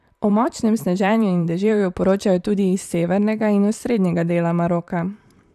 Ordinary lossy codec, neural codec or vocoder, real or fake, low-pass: none; vocoder, 44.1 kHz, 128 mel bands, Pupu-Vocoder; fake; 14.4 kHz